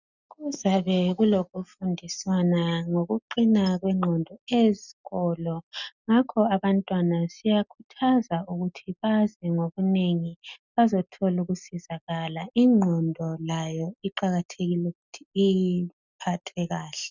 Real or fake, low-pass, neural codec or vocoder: real; 7.2 kHz; none